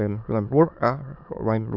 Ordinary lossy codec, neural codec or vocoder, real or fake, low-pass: none; autoencoder, 22.05 kHz, a latent of 192 numbers a frame, VITS, trained on many speakers; fake; 5.4 kHz